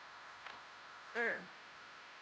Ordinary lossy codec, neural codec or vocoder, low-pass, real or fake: none; codec, 16 kHz, 0.5 kbps, FunCodec, trained on Chinese and English, 25 frames a second; none; fake